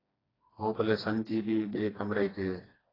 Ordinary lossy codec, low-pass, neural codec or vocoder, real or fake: AAC, 24 kbps; 5.4 kHz; codec, 16 kHz, 2 kbps, FreqCodec, smaller model; fake